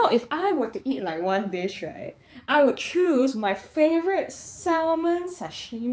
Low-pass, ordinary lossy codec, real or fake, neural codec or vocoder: none; none; fake; codec, 16 kHz, 4 kbps, X-Codec, HuBERT features, trained on balanced general audio